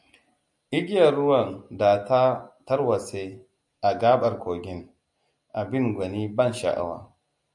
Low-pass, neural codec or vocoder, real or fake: 10.8 kHz; none; real